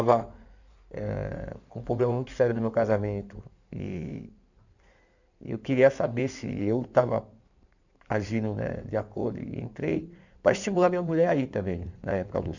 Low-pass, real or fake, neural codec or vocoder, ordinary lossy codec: 7.2 kHz; fake; codec, 16 kHz in and 24 kHz out, 2.2 kbps, FireRedTTS-2 codec; none